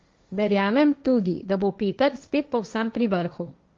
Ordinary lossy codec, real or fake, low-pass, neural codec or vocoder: Opus, 32 kbps; fake; 7.2 kHz; codec, 16 kHz, 1.1 kbps, Voila-Tokenizer